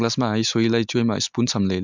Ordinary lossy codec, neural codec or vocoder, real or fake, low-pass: MP3, 64 kbps; none; real; 7.2 kHz